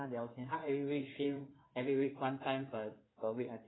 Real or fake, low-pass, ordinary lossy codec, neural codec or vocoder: fake; 7.2 kHz; AAC, 16 kbps; codec, 16 kHz in and 24 kHz out, 2.2 kbps, FireRedTTS-2 codec